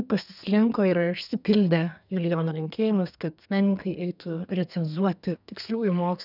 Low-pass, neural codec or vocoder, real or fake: 5.4 kHz; codec, 32 kHz, 1.9 kbps, SNAC; fake